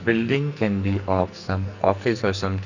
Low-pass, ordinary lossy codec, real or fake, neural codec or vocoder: 7.2 kHz; none; fake; codec, 44.1 kHz, 2.6 kbps, SNAC